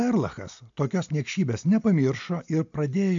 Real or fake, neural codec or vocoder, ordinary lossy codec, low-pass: real; none; AAC, 64 kbps; 7.2 kHz